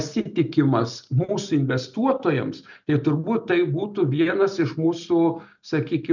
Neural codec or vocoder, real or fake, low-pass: none; real; 7.2 kHz